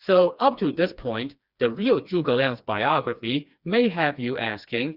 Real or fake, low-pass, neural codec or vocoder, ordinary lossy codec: fake; 5.4 kHz; codec, 16 kHz, 2 kbps, FreqCodec, smaller model; Opus, 64 kbps